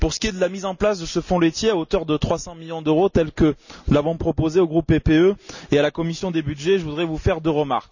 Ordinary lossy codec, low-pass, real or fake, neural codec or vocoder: none; 7.2 kHz; real; none